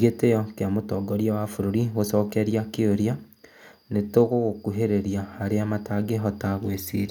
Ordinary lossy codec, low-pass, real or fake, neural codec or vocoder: none; 19.8 kHz; real; none